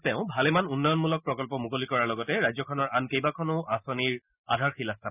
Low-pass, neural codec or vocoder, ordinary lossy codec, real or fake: 3.6 kHz; none; none; real